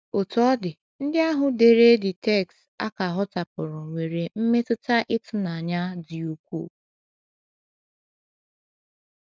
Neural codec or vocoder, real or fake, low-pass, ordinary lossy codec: none; real; none; none